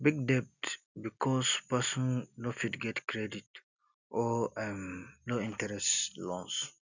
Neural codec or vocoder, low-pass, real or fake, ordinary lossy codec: none; 7.2 kHz; real; none